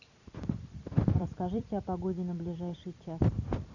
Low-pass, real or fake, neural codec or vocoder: 7.2 kHz; fake; vocoder, 44.1 kHz, 128 mel bands every 256 samples, BigVGAN v2